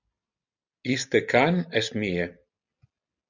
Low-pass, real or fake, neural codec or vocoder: 7.2 kHz; real; none